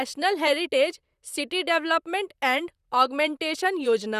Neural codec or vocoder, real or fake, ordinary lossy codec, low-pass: vocoder, 44.1 kHz, 128 mel bands, Pupu-Vocoder; fake; Opus, 64 kbps; 14.4 kHz